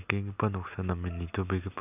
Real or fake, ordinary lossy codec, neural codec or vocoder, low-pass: real; none; none; 3.6 kHz